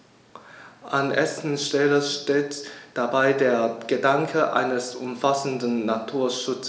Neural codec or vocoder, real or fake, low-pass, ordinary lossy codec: none; real; none; none